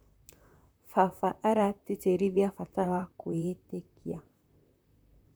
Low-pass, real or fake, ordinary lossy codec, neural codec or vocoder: none; fake; none; vocoder, 44.1 kHz, 128 mel bands, Pupu-Vocoder